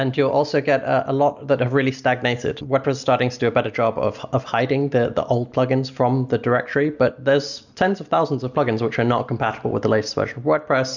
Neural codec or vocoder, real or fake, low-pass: none; real; 7.2 kHz